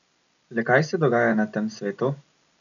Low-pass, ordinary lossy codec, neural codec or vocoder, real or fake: 7.2 kHz; none; none; real